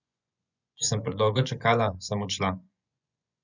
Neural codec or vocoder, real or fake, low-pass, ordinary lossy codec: vocoder, 24 kHz, 100 mel bands, Vocos; fake; 7.2 kHz; none